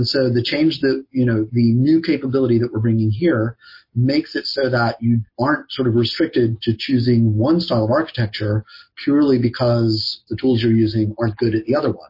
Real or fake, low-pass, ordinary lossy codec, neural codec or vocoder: real; 5.4 kHz; MP3, 32 kbps; none